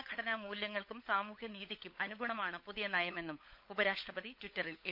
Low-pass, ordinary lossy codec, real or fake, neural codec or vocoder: 5.4 kHz; none; fake; codec, 16 kHz, 16 kbps, FunCodec, trained on LibriTTS, 50 frames a second